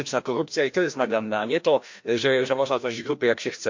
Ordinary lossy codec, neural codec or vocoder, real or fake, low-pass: MP3, 48 kbps; codec, 16 kHz, 1 kbps, FreqCodec, larger model; fake; 7.2 kHz